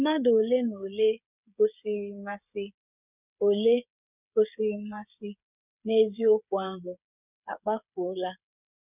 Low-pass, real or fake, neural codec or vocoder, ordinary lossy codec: 3.6 kHz; fake; codec, 16 kHz, 8 kbps, FreqCodec, smaller model; none